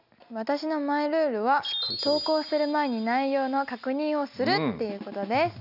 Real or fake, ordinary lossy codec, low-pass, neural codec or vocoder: real; none; 5.4 kHz; none